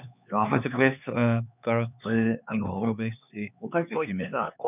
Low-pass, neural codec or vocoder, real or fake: 3.6 kHz; codec, 16 kHz, 4 kbps, X-Codec, HuBERT features, trained on LibriSpeech; fake